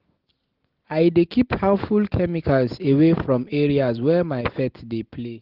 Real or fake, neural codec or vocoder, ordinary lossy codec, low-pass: real; none; Opus, 16 kbps; 5.4 kHz